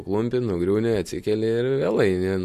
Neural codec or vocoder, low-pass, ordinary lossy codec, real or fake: none; 14.4 kHz; MP3, 64 kbps; real